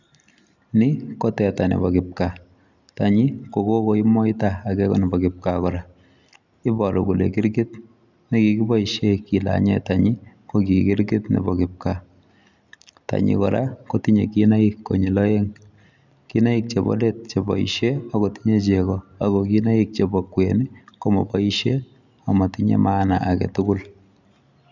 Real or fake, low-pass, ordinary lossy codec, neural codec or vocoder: real; 7.2 kHz; none; none